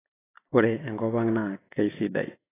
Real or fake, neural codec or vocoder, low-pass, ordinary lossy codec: real; none; 3.6 kHz; AAC, 16 kbps